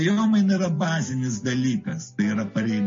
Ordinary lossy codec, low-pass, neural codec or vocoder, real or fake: MP3, 32 kbps; 7.2 kHz; none; real